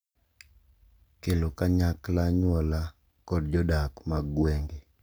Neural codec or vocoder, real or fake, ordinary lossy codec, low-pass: none; real; none; none